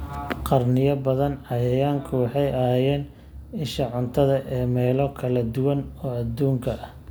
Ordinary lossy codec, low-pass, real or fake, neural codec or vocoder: none; none; real; none